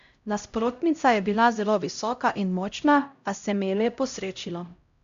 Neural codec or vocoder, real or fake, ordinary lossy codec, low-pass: codec, 16 kHz, 0.5 kbps, X-Codec, HuBERT features, trained on LibriSpeech; fake; MP3, 64 kbps; 7.2 kHz